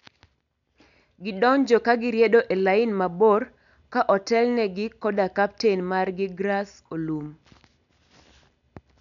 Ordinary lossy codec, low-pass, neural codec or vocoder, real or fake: none; 7.2 kHz; none; real